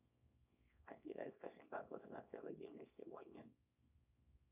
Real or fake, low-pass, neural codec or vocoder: fake; 3.6 kHz; codec, 24 kHz, 0.9 kbps, WavTokenizer, small release